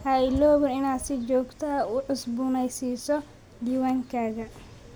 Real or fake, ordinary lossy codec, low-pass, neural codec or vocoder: real; none; none; none